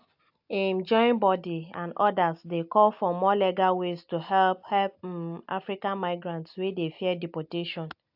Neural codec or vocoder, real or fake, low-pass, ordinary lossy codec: none; real; 5.4 kHz; AAC, 48 kbps